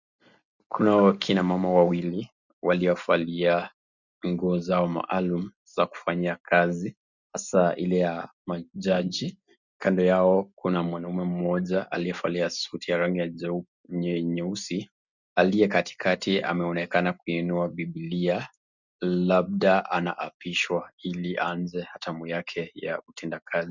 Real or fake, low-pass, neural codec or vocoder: real; 7.2 kHz; none